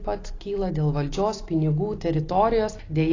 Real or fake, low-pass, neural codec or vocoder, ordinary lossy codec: real; 7.2 kHz; none; AAC, 32 kbps